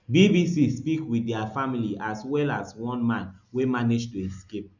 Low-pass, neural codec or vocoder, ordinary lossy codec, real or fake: 7.2 kHz; none; none; real